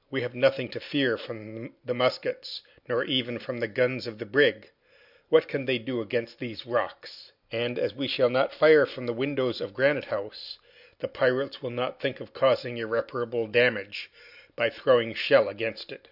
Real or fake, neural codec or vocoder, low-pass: real; none; 5.4 kHz